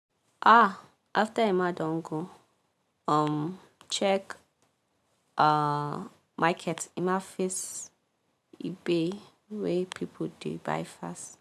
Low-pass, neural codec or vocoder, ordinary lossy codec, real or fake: 14.4 kHz; none; none; real